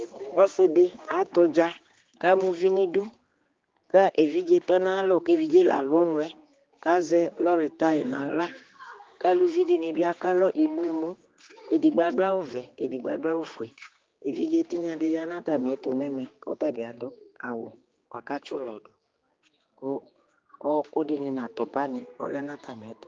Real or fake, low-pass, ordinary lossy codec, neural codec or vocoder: fake; 7.2 kHz; Opus, 32 kbps; codec, 16 kHz, 2 kbps, X-Codec, HuBERT features, trained on general audio